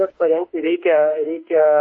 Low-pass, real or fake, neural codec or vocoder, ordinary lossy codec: 9.9 kHz; fake; autoencoder, 48 kHz, 32 numbers a frame, DAC-VAE, trained on Japanese speech; MP3, 32 kbps